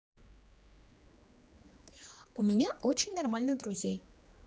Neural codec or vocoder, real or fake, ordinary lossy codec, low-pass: codec, 16 kHz, 2 kbps, X-Codec, HuBERT features, trained on general audio; fake; none; none